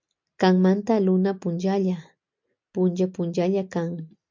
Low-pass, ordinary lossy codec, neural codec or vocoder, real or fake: 7.2 kHz; MP3, 64 kbps; none; real